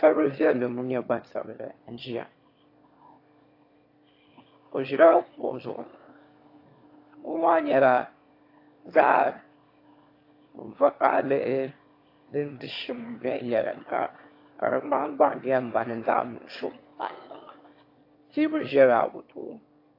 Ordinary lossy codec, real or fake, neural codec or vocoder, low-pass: AAC, 32 kbps; fake; autoencoder, 22.05 kHz, a latent of 192 numbers a frame, VITS, trained on one speaker; 5.4 kHz